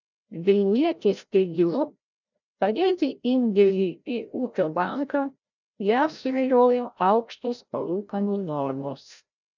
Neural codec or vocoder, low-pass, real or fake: codec, 16 kHz, 0.5 kbps, FreqCodec, larger model; 7.2 kHz; fake